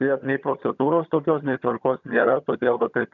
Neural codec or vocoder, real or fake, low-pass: vocoder, 22.05 kHz, 80 mel bands, HiFi-GAN; fake; 7.2 kHz